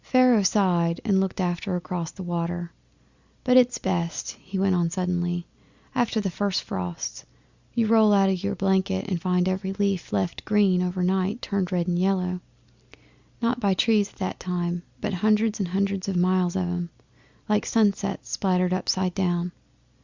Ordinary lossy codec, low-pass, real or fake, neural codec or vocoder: Opus, 64 kbps; 7.2 kHz; real; none